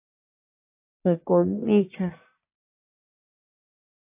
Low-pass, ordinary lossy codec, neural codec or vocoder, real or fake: 3.6 kHz; MP3, 32 kbps; codec, 44.1 kHz, 1.7 kbps, Pupu-Codec; fake